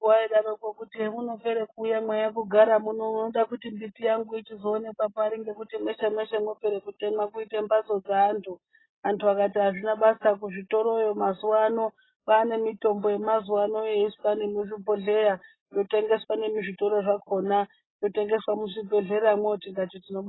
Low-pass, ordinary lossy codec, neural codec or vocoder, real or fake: 7.2 kHz; AAC, 16 kbps; none; real